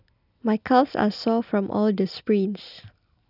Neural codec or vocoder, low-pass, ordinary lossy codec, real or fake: none; 5.4 kHz; none; real